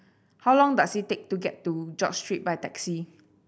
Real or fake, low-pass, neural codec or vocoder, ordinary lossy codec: real; none; none; none